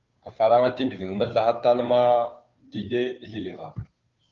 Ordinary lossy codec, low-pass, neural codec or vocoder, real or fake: Opus, 32 kbps; 7.2 kHz; codec, 16 kHz, 2 kbps, FunCodec, trained on Chinese and English, 25 frames a second; fake